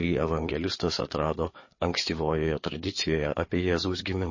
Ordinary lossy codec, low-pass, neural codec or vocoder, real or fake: MP3, 32 kbps; 7.2 kHz; codec, 16 kHz, 4 kbps, FreqCodec, larger model; fake